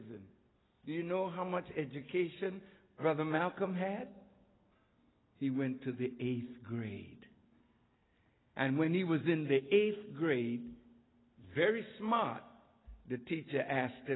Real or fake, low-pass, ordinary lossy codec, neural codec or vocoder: real; 7.2 kHz; AAC, 16 kbps; none